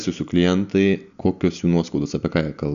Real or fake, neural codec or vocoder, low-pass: real; none; 7.2 kHz